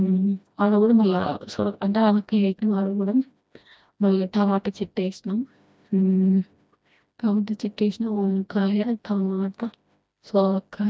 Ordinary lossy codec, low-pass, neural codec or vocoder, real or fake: none; none; codec, 16 kHz, 1 kbps, FreqCodec, smaller model; fake